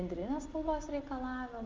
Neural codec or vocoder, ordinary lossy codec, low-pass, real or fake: none; Opus, 24 kbps; 7.2 kHz; real